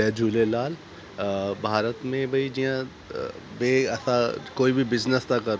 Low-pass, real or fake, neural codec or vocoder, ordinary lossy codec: none; real; none; none